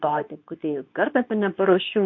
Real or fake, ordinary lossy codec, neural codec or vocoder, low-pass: fake; MP3, 48 kbps; codec, 24 kHz, 0.9 kbps, WavTokenizer, medium speech release version 2; 7.2 kHz